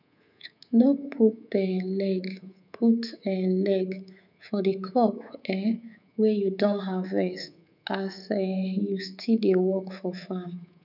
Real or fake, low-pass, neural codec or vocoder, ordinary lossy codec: fake; 5.4 kHz; codec, 24 kHz, 3.1 kbps, DualCodec; none